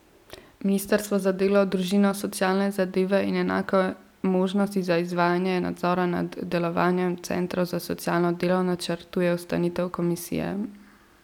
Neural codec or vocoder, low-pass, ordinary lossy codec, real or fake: none; 19.8 kHz; none; real